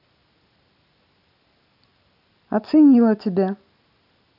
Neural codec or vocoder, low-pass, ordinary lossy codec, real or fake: none; 5.4 kHz; none; real